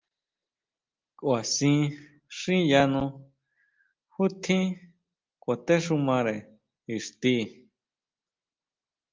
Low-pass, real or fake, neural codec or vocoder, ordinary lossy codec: 7.2 kHz; real; none; Opus, 32 kbps